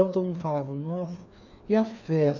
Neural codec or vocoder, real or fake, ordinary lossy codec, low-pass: codec, 16 kHz, 2 kbps, FreqCodec, larger model; fake; none; 7.2 kHz